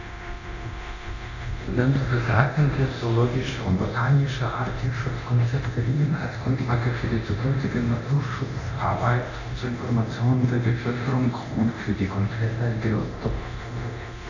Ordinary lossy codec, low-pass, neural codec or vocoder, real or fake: none; 7.2 kHz; codec, 24 kHz, 0.9 kbps, DualCodec; fake